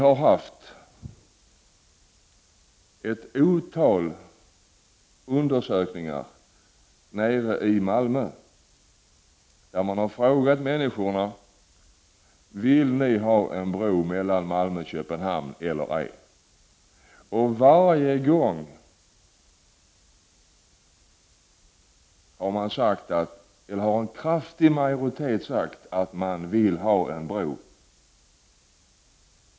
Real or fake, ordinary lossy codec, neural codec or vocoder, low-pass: real; none; none; none